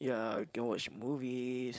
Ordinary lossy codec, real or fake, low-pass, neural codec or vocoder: none; fake; none; codec, 16 kHz, 4.8 kbps, FACodec